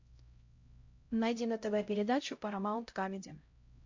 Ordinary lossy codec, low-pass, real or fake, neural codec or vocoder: MP3, 48 kbps; 7.2 kHz; fake; codec, 16 kHz, 0.5 kbps, X-Codec, HuBERT features, trained on LibriSpeech